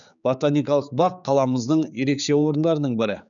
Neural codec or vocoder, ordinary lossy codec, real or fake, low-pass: codec, 16 kHz, 4 kbps, X-Codec, HuBERT features, trained on general audio; none; fake; 7.2 kHz